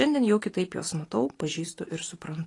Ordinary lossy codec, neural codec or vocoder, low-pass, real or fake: AAC, 32 kbps; none; 10.8 kHz; real